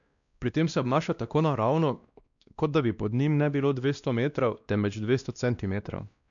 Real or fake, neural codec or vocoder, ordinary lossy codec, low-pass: fake; codec, 16 kHz, 1 kbps, X-Codec, WavLM features, trained on Multilingual LibriSpeech; none; 7.2 kHz